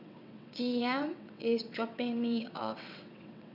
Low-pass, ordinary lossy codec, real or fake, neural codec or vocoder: 5.4 kHz; none; fake; vocoder, 22.05 kHz, 80 mel bands, WaveNeXt